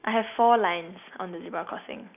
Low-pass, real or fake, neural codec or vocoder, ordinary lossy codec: 3.6 kHz; real; none; none